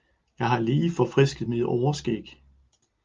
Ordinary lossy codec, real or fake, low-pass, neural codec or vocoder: Opus, 32 kbps; real; 7.2 kHz; none